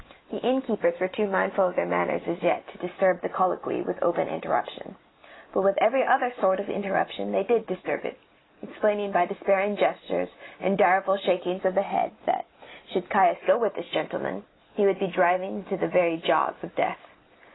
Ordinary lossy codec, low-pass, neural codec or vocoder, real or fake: AAC, 16 kbps; 7.2 kHz; none; real